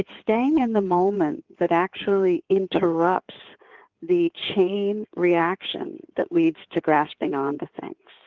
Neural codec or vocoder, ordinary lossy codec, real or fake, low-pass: codec, 16 kHz in and 24 kHz out, 2.2 kbps, FireRedTTS-2 codec; Opus, 32 kbps; fake; 7.2 kHz